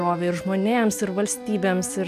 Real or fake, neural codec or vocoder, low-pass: real; none; 14.4 kHz